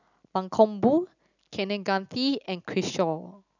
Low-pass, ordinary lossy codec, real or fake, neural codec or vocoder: 7.2 kHz; none; real; none